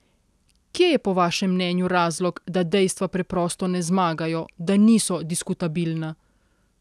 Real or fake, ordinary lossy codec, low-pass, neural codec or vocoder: real; none; none; none